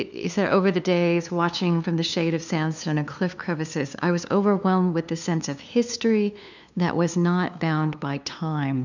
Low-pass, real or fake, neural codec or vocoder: 7.2 kHz; fake; codec, 16 kHz, 2 kbps, FunCodec, trained on LibriTTS, 25 frames a second